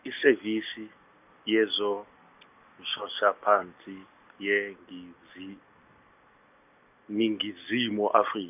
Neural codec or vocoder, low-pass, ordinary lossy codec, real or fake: none; 3.6 kHz; none; real